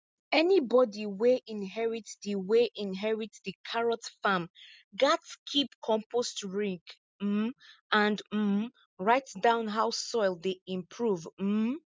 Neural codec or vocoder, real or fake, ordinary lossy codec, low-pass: none; real; none; none